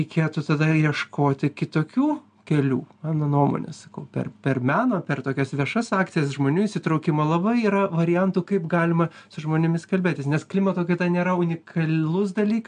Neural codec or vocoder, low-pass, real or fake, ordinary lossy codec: none; 9.9 kHz; real; MP3, 96 kbps